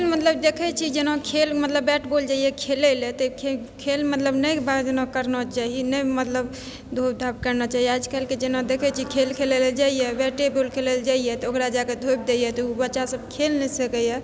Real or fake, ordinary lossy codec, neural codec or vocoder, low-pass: real; none; none; none